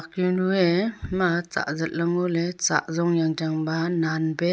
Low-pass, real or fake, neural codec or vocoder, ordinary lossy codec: none; real; none; none